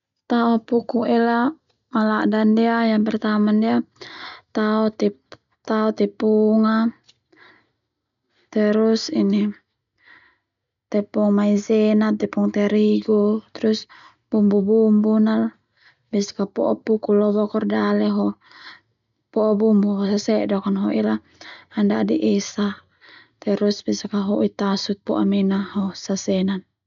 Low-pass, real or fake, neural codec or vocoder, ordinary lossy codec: 7.2 kHz; real; none; none